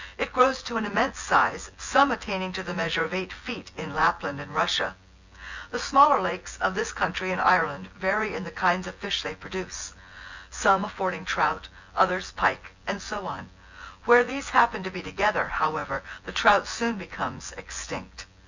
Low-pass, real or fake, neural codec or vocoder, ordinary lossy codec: 7.2 kHz; fake; vocoder, 24 kHz, 100 mel bands, Vocos; Opus, 64 kbps